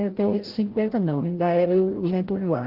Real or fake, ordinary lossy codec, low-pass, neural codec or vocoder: fake; Opus, 16 kbps; 5.4 kHz; codec, 16 kHz, 0.5 kbps, FreqCodec, larger model